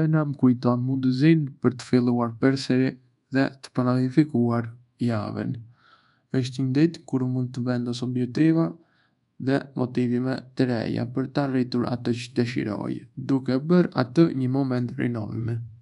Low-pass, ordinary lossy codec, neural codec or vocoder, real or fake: 10.8 kHz; none; codec, 24 kHz, 1.2 kbps, DualCodec; fake